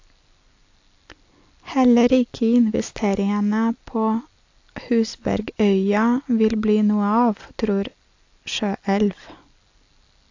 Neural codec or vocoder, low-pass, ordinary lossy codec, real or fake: none; 7.2 kHz; AAC, 48 kbps; real